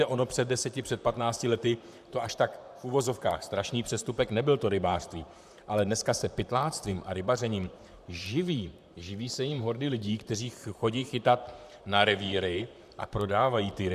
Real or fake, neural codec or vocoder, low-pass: fake; vocoder, 44.1 kHz, 128 mel bands, Pupu-Vocoder; 14.4 kHz